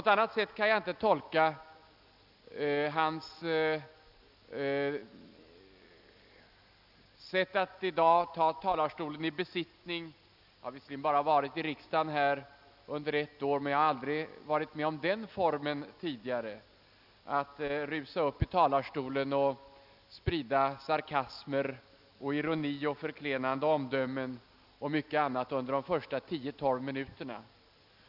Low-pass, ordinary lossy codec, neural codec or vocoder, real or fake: 5.4 kHz; none; none; real